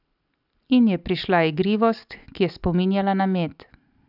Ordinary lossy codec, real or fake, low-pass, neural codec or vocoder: none; real; 5.4 kHz; none